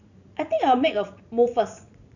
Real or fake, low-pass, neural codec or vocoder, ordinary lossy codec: fake; 7.2 kHz; vocoder, 44.1 kHz, 80 mel bands, Vocos; none